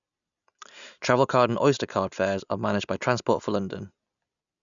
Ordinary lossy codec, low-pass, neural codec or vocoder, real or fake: none; 7.2 kHz; none; real